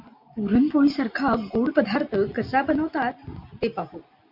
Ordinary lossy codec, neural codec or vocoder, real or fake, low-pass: MP3, 48 kbps; none; real; 5.4 kHz